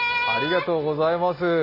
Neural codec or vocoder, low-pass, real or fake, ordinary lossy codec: none; 5.4 kHz; real; MP3, 24 kbps